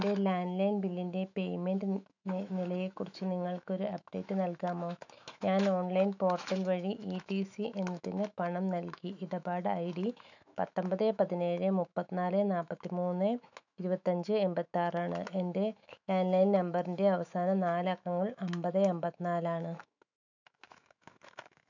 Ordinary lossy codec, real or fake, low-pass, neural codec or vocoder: none; fake; 7.2 kHz; autoencoder, 48 kHz, 128 numbers a frame, DAC-VAE, trained on Japanese speech